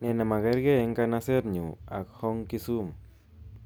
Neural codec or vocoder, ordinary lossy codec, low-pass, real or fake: none; none; none; real